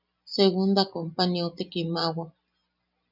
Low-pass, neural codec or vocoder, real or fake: 5.4 kHz; none; real